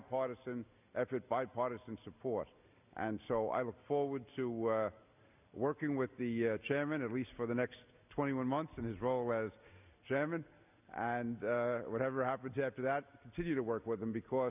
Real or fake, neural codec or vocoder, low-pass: real; none; 3.6 kHz